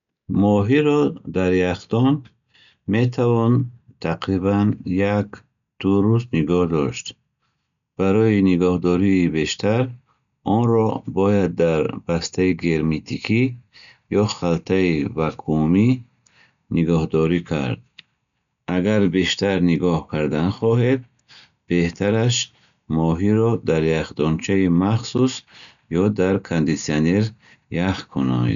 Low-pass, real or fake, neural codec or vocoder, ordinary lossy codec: 7.2 kHz; real; none; none